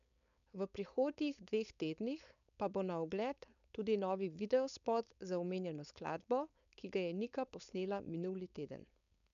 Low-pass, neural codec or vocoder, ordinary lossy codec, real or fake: 7.2 kHz; codec, 16 kHz, 4.8 kbps, FACodec; none; fake